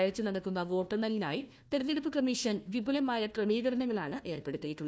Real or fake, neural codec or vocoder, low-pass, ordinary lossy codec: fake; codec, 16 kHz, 1 kbps, FunCodec, trained on Chinese and English, 50 frames a second; none; none